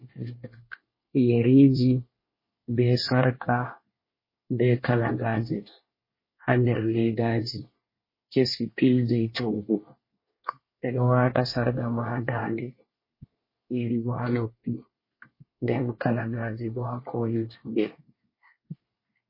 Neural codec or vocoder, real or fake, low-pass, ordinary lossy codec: codec, 24 kHz, 1 kbps, SNAC; fake; 5.4 kHz; MP3, 24 kbps